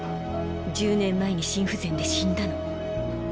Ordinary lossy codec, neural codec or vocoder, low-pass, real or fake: none; none; none; real